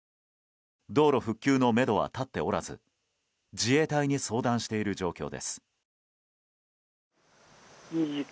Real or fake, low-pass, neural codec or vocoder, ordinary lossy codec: real; none; none; none